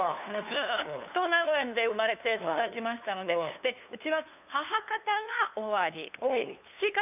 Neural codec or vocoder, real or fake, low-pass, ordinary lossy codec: codec, 16 kHz, 2 kbps, FunCodec, trained on LibriTTS, 25 frames a second; fake; 3.6 kHz; none